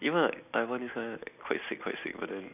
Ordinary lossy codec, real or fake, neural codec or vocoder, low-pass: none; real; none; 3.6 kHz